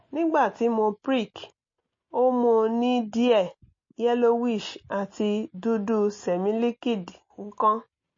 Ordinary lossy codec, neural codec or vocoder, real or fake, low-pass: MP3, 32 kbps; none; real; 7.2 kHz